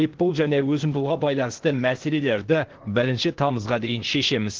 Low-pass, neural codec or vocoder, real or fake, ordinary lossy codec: 7.2 kHz; codec, 16 kHz, 0.8 kbps, ZipCodec; fake; Opus, 16 kbps